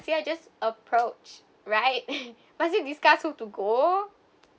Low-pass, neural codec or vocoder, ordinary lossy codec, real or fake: none; none; none; real